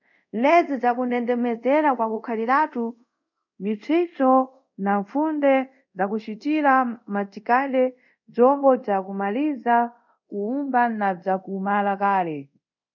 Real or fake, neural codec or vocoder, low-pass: fake; codec, 24 kHz, 0.5 kbps, DualCodec; 7.2 kHz